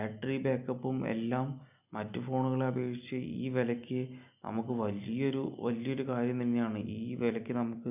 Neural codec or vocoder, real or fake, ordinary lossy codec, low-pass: none; real; none; 3.6 kHz